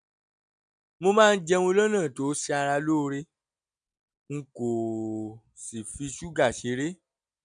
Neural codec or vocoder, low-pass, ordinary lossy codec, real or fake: none; 9.9 kHz; none; real